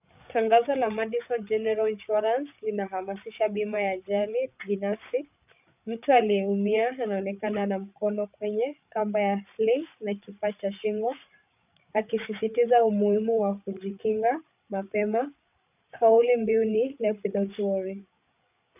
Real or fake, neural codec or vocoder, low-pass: fake; codec, 16 kHz, 8 kbps, FreqCodec, larger model; 3.6 kHz